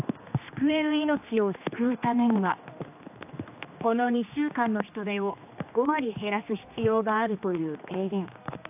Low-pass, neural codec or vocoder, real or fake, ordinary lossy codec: 3.6 kHz; codec, 16 kHz, 2 kbps, X-Codec, HuBERT features, trained on general audio; fake; AAC, 32 kbps